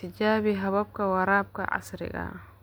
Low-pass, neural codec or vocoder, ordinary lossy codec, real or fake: none; none; none; real